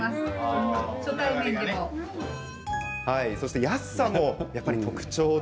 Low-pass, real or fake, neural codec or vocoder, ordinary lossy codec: none; real; none; none